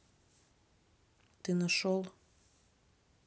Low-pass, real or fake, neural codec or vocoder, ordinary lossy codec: none; real; none; none